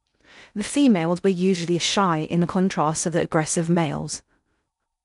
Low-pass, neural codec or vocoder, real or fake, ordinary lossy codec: 10.8 kHz; codec, 16 kHz in and 24 kHz out, 0.6 kbps, FocalCodec, streaming, 2048 codes; fake; none